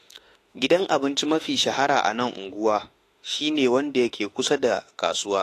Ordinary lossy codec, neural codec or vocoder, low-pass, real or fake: AAC, 48 kbps; autoencoder, 48 kHz, 32 numbers a frame, DAC-VAE, trained on Japanese speech; 14.4 kHz; fake